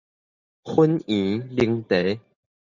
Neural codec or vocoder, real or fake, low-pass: none; real; 7.2 kHz